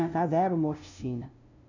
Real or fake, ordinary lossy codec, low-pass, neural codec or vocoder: fake; none; 7.2 kHz; codec, 16 kHz, 0.5 kbps, FunCodec, trained on LibriTTS, 25 frames a second